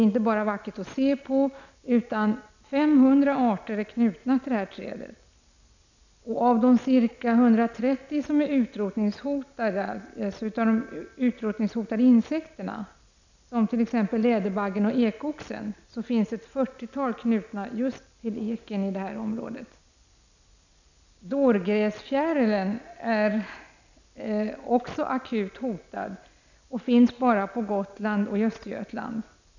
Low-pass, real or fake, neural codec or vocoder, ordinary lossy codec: 7.2 kHz; real; none; none